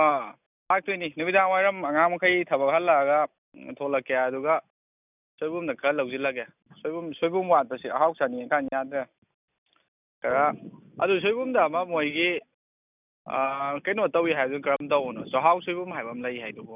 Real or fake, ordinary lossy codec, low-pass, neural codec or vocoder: real; none; 3.6 kHz; none